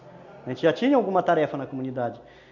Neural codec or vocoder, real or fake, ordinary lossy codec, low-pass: none; real; none; 7.2 kHz